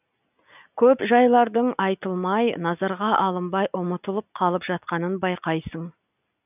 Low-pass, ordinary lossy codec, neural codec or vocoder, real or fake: 3.6 kHz; none; none; real